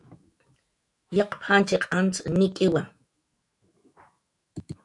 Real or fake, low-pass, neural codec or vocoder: fake; 10.8 kHz; autoencoder, 48 kHz, 128 numbers a frame, DAC-VAE, trained on Japanese speech